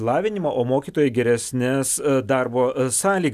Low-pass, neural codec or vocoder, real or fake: 14.4 kHz; none; real